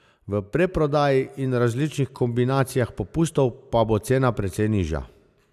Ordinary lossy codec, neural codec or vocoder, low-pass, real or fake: none; none; 14.4 kHz; real